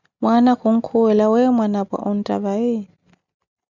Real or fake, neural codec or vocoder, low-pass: real; none; 7.2 kHz